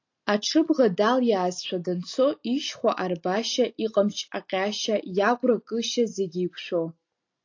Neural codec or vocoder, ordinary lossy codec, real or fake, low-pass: none; AAC, 48 kbps; real; 7.2 kHz